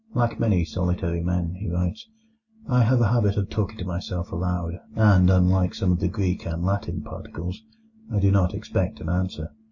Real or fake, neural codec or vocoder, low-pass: real; none; 7.2 kHz